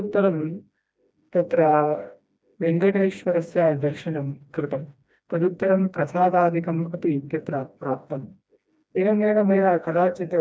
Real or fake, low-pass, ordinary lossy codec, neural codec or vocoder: fake; none; none; codec, 16 kHz, 1 kbps, FreqCodec, smaller model